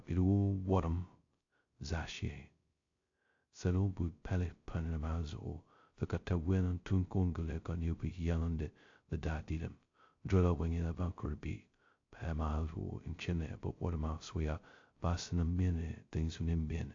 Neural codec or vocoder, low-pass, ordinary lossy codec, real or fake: codec, 16 kHz, 0.2 kbps, FocalCodec; 7.2 kHz; AAC, 48 kbps; fake